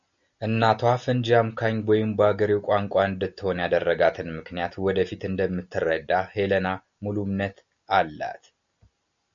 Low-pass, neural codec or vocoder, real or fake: 7.2 kHz; none; real